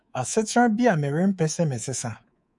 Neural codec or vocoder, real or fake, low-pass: codec, 24 kHz, 3.1 kbps, DualCodec; fake; 10.8 kHz